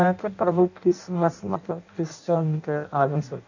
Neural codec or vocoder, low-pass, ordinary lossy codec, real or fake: codec, 16 kHz in and 24 kHz out, 0.6 kbps, FireRedTTS-2 codec; 7.2 kHz; none; fake